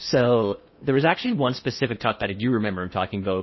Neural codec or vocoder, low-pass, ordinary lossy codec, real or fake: codec, 16 kHz in and 24 kHz out, 0.6 kbps, FocalCodec, streaming, 4096 codes; 7.2 kHz; MP3, 24 kbps; fake